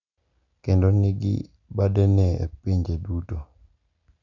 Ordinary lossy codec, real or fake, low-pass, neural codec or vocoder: none; real; 7.2 kHz; none